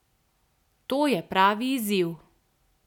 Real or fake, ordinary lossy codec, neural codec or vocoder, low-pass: real; none; none; 19.8 kHz